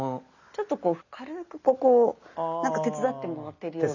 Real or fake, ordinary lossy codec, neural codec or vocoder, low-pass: real; none; none; 7.2 kHz